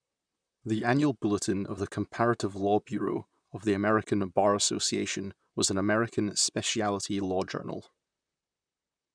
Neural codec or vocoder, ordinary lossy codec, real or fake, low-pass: vocoder, 44.1 kHz, 128 mel bands, Pupu-Vocoder; none; fake; 9.9 kHz